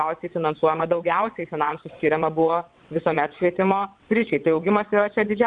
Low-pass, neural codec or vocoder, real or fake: 9.9 kHz; vocoder, 22.05 kHz, 80 mel bands, WaveNeXt; fake